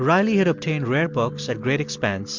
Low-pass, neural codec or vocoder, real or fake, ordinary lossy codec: 7.2 kHz; none; real; MP3, 64 kbps